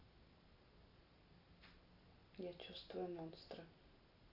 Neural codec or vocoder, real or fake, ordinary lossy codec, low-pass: none; real; AAC, 32 kbps; 5.4 kHz